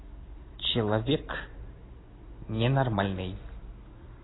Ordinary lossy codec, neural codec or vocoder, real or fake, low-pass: AAC, 16 kbps; none; real; 7.2 kHz